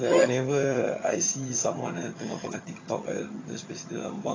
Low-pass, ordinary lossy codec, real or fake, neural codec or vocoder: 7.2 kHz; AAC, 32 kbps; fake; vocoder, 22.05 kHz, 80 mel bands, HiFi-GAN